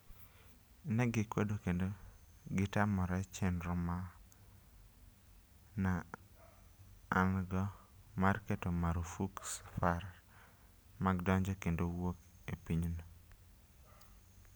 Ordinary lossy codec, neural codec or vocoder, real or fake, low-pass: none; none; real; none